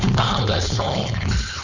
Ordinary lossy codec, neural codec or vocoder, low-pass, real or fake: Opus, 64 kbps; codec, 16 kHz, 4.8 kbps, FACodec; 7.2 kHz; fake